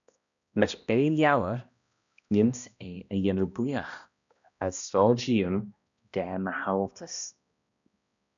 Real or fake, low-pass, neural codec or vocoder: fake; 7.2 kHz; codec, 16 kHz, 1 kbps, X-Codec, HuBERT features, trained on balanced general audio